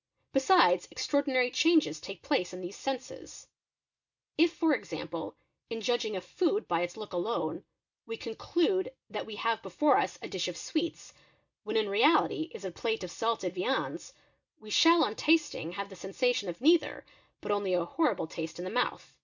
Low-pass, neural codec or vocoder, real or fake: 7.2 kHz; none; real